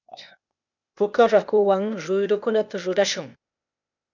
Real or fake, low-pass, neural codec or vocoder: fake; 7.2 kHz; codec, 16 kHz, 0.8 kbps, ZipCodec